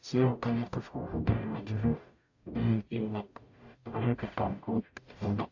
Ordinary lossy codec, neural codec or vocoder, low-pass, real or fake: AAC, 48 kbps; codec, 44.1 kHz, 0.9 kbps, DAC; 7.2 kHz; fake